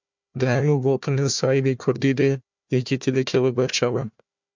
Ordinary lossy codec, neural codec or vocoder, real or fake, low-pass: MP3, 64 kbps; codec, 16 kHz, 1 kbps, FunCodec, trained on Chinese and English, 50 frames a second; fake; 7.2 kHz